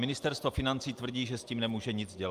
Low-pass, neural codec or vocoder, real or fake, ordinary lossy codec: 14.4 kHz; none; real; Opus, 32 kbps